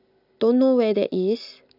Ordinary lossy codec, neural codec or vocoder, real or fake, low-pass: none; none; real; 5.4 kHz